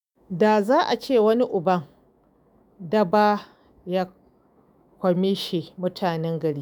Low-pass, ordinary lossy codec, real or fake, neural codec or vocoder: none; none; fake; autoencoder, 48 kHz, 128 numbers a frame, DAC-VAE, trained on Japanese speech